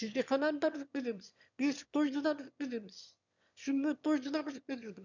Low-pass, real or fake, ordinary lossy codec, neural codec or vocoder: 7.2 kHz; fake; none; autoencoder, 22.05 kHz, a latent of 192 numbers a frame, VITS, trained on one speaker